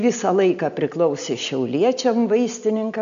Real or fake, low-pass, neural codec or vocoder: real; 7.2 kHz; none